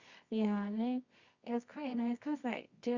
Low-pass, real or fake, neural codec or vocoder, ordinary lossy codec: 7.2 kHz; fake; codec, 24 kHz, 0.9 kbps, WavTokenizer, medium music audio release; Opus, 64 kbps